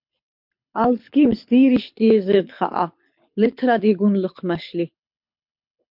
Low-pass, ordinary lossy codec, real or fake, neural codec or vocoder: 5.4 kHz; MP3, 48 kbps; fake; codec, 24 kHz, 6 kbps, HILCodec